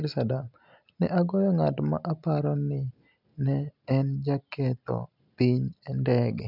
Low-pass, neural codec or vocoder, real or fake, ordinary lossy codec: 5.4 kHz; none; real; none